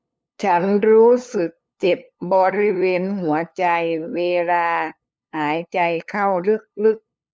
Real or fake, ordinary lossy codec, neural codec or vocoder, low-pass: fake; none; codec, 16 kHz, 2 kbps, FunCodec, trained on LibriTTS, 25 frames a second; none